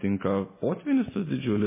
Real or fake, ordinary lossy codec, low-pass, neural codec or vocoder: real; MP3, 16 kbps; 3.6 kHz; none